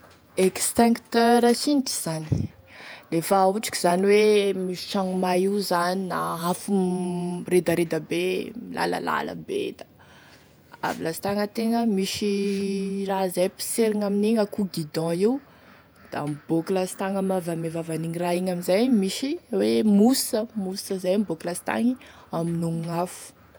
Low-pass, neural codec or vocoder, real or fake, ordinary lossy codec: none; vocoder, 48 kHz, 128 mel bands, Vocos; fake; none